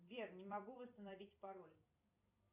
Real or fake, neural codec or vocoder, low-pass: fake; vocoder, 44.1 kHz, 128 mel bands every 256 samples, BigVGAN v2; 3.6 kHz